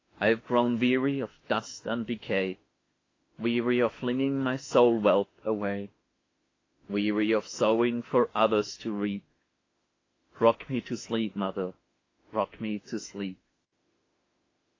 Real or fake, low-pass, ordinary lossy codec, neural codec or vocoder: fake; 7.2 kHz; AAC, 32 kbps; autoencoder, 48 kHz, 32 numbers a frame, DAC-VAE, trained on Japanese speech